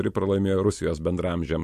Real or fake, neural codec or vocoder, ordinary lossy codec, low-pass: real; none; MP3, 64 kbps; 14.4 kHz